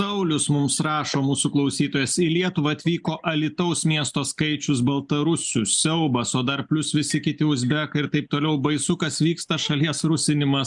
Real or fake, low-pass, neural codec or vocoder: real; 10.8 kHz; none